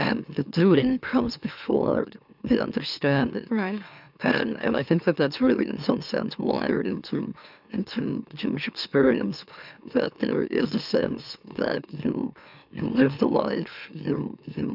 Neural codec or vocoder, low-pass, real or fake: autoencoder, 44.1 kHz, a latent of 192 numbers a frame, MeloTTS; 5.4 kHz; fake